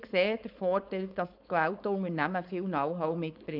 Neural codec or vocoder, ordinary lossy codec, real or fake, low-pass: codec, 16 kHz, 4.8 kbps, FACodec; none; fake; 5.4 kHz